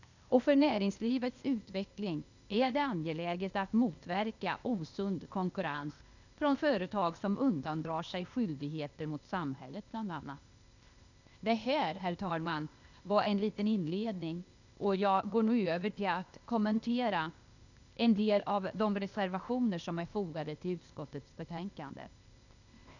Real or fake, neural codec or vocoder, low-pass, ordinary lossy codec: fake; codec, 16 kHz, 0.8 kbps, ZipCodec; 7.2 kHz; none